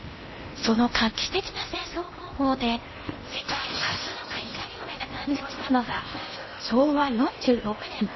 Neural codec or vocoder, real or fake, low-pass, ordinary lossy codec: codec, 16 kHz in and 24 kHz out, 0.8 kbps, FocalCodec, streaming, 65536 codes; fake; 7.2 kHz; MP3, 24 kbps